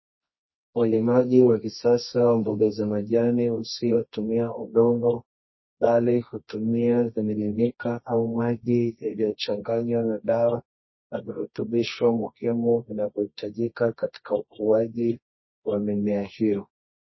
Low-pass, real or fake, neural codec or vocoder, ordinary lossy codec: 7.2 kHz; fake; codec, 24 kHz, 0.9 kbps, WavTokenizer, medium music audio release; MP3, 24 kbps